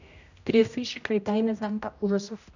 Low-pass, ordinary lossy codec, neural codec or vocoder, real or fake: 7.2 kHz; none; codec, 16 kHz, 0.5 kbps, X-Codec, HuBERT features, trained on general audio; fake